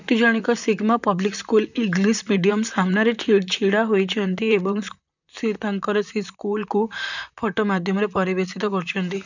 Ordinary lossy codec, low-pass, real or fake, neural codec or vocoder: none; 7.2 kHz; fake; vocoder, 44.1 kHz, 128 mel bands, Pupu-Vocoder